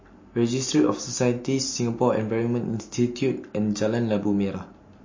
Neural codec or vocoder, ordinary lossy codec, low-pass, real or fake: none; MP3, 32 kbps; 7.2 kHz; real